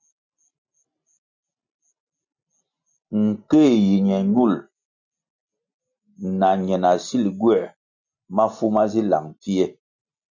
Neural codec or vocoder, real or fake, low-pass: none; real; 7.2 kHz